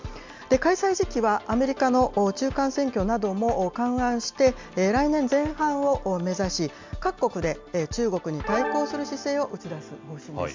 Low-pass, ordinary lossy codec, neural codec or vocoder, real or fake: 7.2 kHz; MP3, 64 kbps; none; real